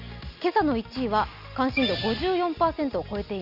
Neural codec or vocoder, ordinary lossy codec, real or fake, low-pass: none; none; real; 5.4 kHz